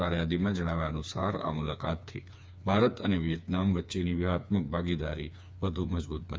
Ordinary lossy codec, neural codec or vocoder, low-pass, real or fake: none; codec, 16 kHz, 4 kbps, FreqCodec, smaller model; none; fake